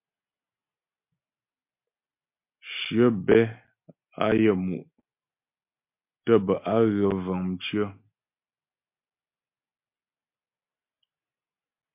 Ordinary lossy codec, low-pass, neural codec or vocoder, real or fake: MP3, 32 kbps; 3.6 kHz; none; real